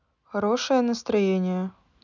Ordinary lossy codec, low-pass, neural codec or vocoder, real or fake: none; 7.2 kHz; none; real